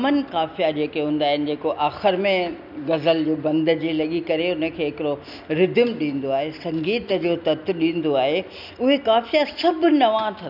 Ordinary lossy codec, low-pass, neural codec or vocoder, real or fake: none; 5.4 kHz; none; real